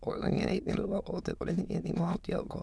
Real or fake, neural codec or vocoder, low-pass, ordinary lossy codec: fake; autoencoder, 22.05 kHz, a latent of 192 numbers a frame, VITS, trained on many speakers; none; none